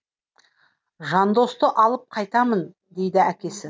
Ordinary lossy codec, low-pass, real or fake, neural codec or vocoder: none; none; real; none